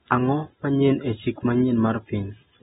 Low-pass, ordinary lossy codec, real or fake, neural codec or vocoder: 19.8 kHz; AAC, 16 kbps; real; none